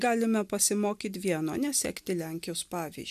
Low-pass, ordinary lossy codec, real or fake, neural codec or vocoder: 14.4 kHz; MP3, 96 kbps; real; none